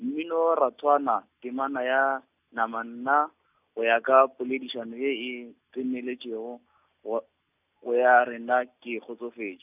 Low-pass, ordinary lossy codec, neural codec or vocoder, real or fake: 3.6 kHz; none; none; real